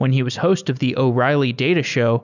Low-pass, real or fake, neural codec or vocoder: 7.2 kHz; real; none